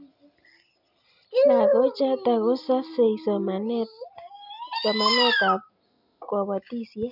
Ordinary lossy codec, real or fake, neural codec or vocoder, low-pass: none; real; none; 5.4 kHz